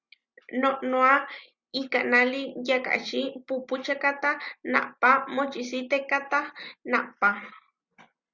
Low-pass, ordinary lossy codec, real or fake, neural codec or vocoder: 7.2 kHz; Opus, 64 kbps; real; none